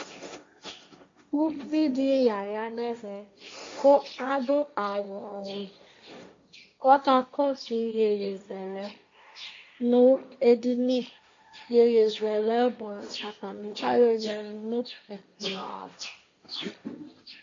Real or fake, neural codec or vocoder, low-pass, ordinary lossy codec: fake; codec, 16 kHz, 1.1 kbps, Voila-Tokenizer; 7.2 kHz; MP3, 48 kbps